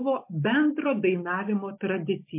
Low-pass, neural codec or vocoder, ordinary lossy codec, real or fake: 3.6 kHz; none; MP3, 24 kbps; real